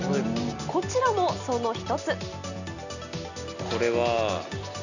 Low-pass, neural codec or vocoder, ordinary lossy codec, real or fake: 7.2 kHz; none; none; real